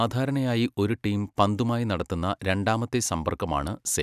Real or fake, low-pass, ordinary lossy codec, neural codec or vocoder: real; 14.4 kHz; none; none